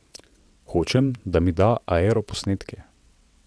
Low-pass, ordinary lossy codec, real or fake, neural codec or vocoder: none; none; fake; vocoder, 22.05 kHz, 80 mel bands, WaveNeXt